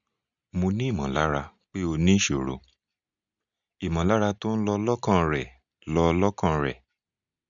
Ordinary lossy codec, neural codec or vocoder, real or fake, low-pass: none; none; real; 7.2 kHz